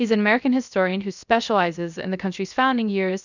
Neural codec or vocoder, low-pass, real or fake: codec, 16 kHz, 0.3 kbps, FocalCodec; 7.2 kHz; fake